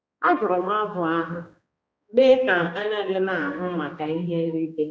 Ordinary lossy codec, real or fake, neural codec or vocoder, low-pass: none; fake; codec, 16 kHz, 1 kbps, X-Codec, HuBERT features, trained on balanced general audio; none